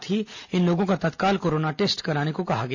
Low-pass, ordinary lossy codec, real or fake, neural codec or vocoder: 7.2 kHz; none; real; none